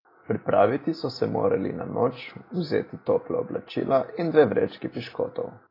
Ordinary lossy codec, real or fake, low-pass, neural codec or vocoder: AAC, 24 kbps; real; 5.4 kHz; none